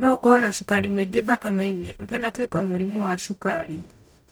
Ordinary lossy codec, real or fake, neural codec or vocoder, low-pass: none; fake; codec, 44.1 kHz, 0.9 kbps, DAC; none